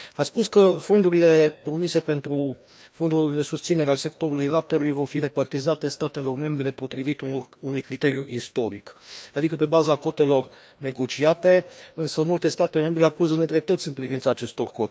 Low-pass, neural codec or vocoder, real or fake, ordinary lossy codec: none; codec, 16 kHz, 1 kbps, FreqCodec, larger model; fake; none